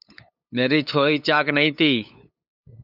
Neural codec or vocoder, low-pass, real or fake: codec, 16 kHz, 8 kbps, FunCodec, trained on LibriTTS, 25 frames a second; 5.4 kHz; fake